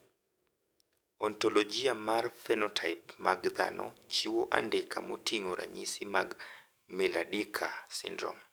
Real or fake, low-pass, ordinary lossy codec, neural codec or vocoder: fake; none; none; codec, 44.1 kHz, 7.8 kbps, DAC